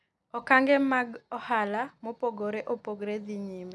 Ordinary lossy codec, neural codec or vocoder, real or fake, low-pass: none; none; real; none